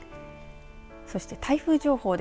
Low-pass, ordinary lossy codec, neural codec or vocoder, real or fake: none; none; none; real